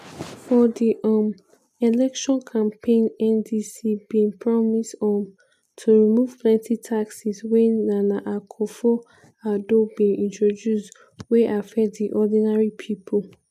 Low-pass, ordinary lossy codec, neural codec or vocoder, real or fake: 14.4 kHz; none; none; real